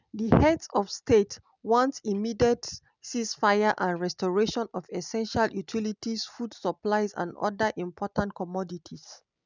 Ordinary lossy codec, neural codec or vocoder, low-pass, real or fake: none; none; 7.2 kHz; real